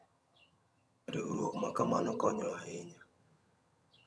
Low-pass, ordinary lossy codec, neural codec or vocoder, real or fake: none; none; vocoder, 22.05 kHz, 80 mel bands, HiFi-GAN; fake